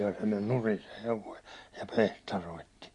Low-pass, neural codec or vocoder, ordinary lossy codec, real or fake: 10.8 kHz; none; MP3, 48 kbps; real